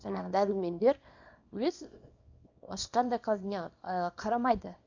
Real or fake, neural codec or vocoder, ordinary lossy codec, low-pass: fake; codec, 24 kHz, 0.9 kbps, WavTokenizer, small release; AAC, 48 kbps; 7.2 kHz